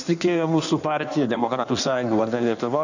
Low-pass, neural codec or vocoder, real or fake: 7.2 kHz; codec, 16 kHz in and 24 kHz out, 1.1 kbps, FireRedTTS-2 codec; fake